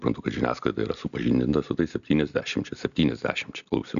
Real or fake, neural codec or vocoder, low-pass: real; none; 7.2 kHz